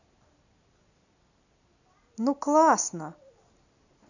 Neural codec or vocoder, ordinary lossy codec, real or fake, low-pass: none; none; real; 7.2 kHz